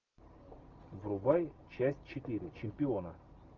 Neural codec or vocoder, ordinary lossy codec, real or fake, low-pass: none; Opus, 32 kbps; real; 7.2 kHz